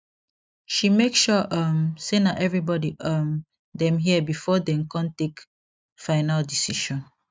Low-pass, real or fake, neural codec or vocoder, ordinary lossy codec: none; real; none; none